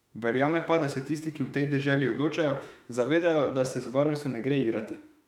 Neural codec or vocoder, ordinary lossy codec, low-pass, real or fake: autoencoder, 48 kHz, 32 numbers a frame, DAC-VAE, trained on Japanese speech; none; 19.8 kHz; fake